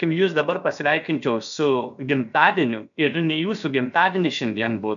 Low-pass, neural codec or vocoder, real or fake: 7.2 kHz; codec, 16 kHz, about 1 kbps, DyCAST, with the encoder's durations; fake